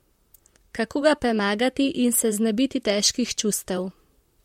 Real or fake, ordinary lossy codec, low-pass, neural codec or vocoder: fake; MP3, 64 kbps; 19.8 kHz; vocoder, 44.1 kHz, 128 mel bands, Pupu-Vocoder